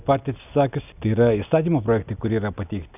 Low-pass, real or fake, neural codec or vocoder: 3.6 kHz; real; none